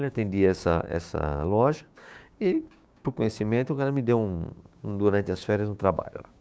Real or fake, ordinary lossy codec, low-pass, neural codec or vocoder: fake; none; none; codec, 16 kHz, 6 kbps, DAC